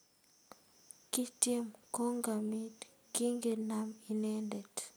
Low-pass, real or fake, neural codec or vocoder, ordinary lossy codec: none; real; none; none